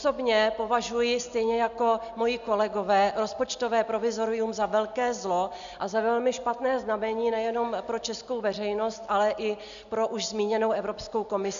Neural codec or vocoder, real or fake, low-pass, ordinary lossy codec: none; real; 7.2 kHz; AAC, 96 kbps